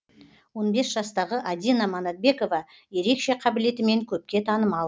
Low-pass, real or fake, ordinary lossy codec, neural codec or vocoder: none; real; none; none